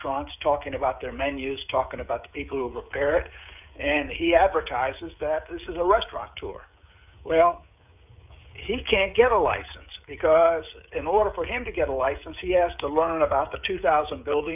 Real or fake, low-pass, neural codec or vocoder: fake; 3.6 kHz; codec, 16 kHz, 16 kbps, FreqCodec, smaller model